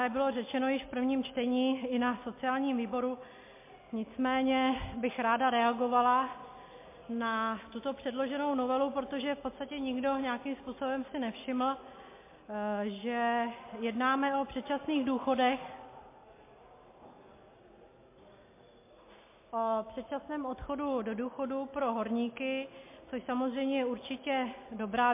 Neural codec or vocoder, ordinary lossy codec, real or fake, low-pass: none; MP3, 24 kbps; real; 3.6 kHz